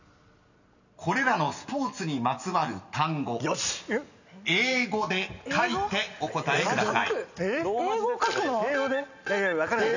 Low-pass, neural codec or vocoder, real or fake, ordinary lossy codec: 7.2 kHz; none; real; none